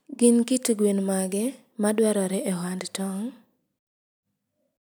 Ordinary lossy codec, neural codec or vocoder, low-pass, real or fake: none; none; none; real